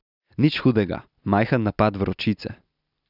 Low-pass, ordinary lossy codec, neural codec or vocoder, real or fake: 5.4 kHz; none; none; real